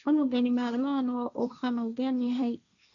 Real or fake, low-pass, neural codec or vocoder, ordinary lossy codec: fake; 7.2 kHz; codec, 16 kHz, 1.1 kbps, Voila-Tokenizer; none